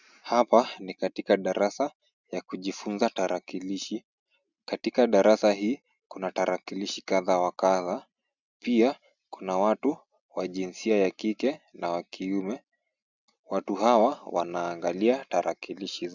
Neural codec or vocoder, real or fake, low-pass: none; real; 7.2 kHz